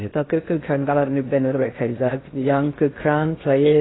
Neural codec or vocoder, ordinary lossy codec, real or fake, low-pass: codec, 16 kHz in and 24 kHz out, 0.6 kbps, FocalCodec, streaming, 2048 codes; AAC, 16 kbps; fake; 7.2 kHz